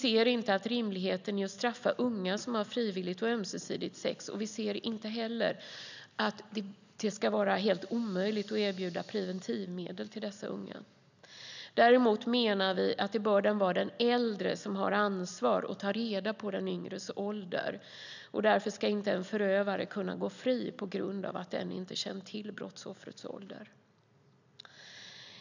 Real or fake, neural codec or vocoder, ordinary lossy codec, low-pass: real; none; none; 7.2 kHz